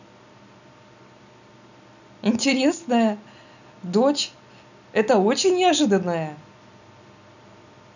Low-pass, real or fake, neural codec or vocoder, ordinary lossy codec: 7.2 kHz; real; none; none